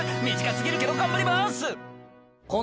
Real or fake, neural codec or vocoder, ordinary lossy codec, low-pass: real; none; none; none